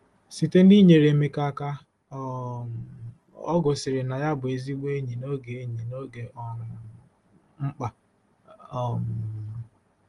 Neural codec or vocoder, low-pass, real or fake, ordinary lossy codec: none; 10.8 kHz; real; Opus, 24 kbps